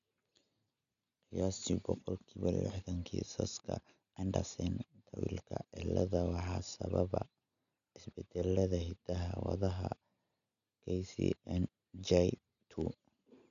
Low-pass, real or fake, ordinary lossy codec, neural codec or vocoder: 7.2 kHz; real; none; none